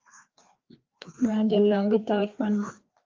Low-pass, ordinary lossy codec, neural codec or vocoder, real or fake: 7.2 kHz; Opus, 32 kbps; codec, 16 kHz, 2 kbps, FreqCodec, larger model; fake